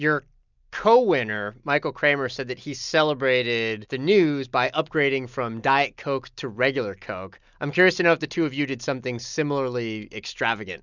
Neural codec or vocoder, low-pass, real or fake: none; 7.2 kHz; real